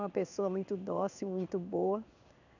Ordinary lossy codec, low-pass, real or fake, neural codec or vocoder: none; 7.2 kHz; fake; codec, 16 kHz in and 24 kHz out, 1 kbps, XY-Tokenizer